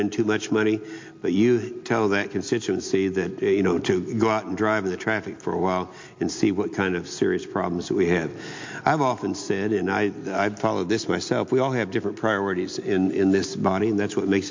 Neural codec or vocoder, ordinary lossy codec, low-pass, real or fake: none; MP3, 48 kbps; 7.2 kHz; real